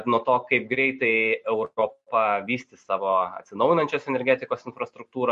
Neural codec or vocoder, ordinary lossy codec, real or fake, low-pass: none; MP3, 48 kbps; real; 10.8 kHz